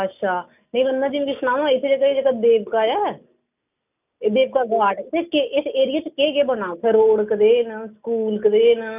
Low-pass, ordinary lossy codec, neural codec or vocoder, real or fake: 3.6 kHz; none; none; real